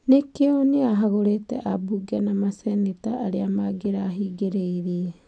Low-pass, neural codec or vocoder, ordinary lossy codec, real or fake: 9.9 kHz; none; none; real